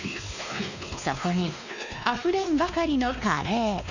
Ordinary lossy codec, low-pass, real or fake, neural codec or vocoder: none; 7.2 kHz; fake; codec, 16 kHz, 2 kbps, X-Codec, WavLM features, trained on Multilingual LibriSpeech